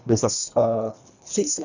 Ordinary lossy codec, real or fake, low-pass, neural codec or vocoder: none; fake; 7.2 kHz; codec, 24 kHz, 1.5 kbps, HILCodec